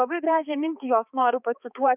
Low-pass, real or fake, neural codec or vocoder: 3.6 kHz; fake; codec, 16 kHz, 4 kbps, X-Codec, HuBERT features, trained on balanced general audio